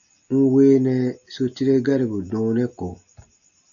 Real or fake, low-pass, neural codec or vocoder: real; 7.2 kHz; none